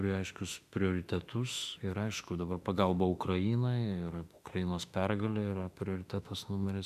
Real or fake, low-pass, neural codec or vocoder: fake; 14.4 kHz; autoencoder, 48 kHz, 32 numbers a frame, DAC-VAE, trained on Japanese speech